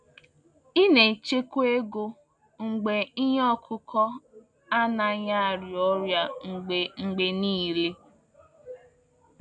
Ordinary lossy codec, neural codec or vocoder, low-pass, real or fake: none; none; 9.9 kHz; real